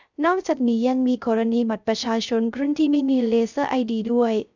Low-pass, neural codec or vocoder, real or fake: 7.2 kHz; codec, 16 kHz, 0.3 kbps, FocalCodec; fake